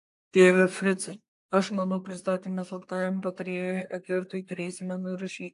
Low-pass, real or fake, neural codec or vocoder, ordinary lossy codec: 10.8 kHz; fake; codec, 24 kHz, 1 kbps, SNAC; AAC, 64 kbps